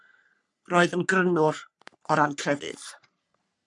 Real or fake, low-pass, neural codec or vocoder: fake; 10.8 kHz; codec, 44.1 kHz, 3.4 kbps, Pupu-Codec